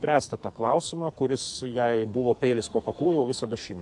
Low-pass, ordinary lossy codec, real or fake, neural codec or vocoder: 10.8 kHz; AAC, 64 kbps; fake; codec, 44.1 kHz, 2.6 kbps, SNAC